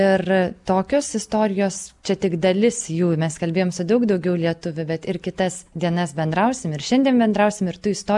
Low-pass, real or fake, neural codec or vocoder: 10.8 kHz; real; none